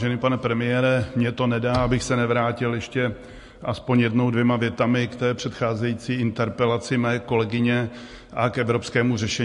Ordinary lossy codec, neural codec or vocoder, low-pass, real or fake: MP3, 48 kbps; none; 14.4 kHz; real